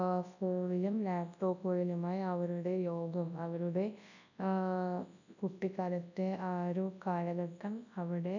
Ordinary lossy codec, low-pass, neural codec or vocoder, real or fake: none; 7.2 kHz; codec, 24 kHz, 0.9 kbps, WavTokenizer, large speech release; fake